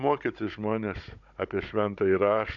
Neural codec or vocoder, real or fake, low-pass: codec, 16 kHz, 16 kbps, FunCodec, trained on Chinese and English, 50 frames a second; fake; 7.2 kHz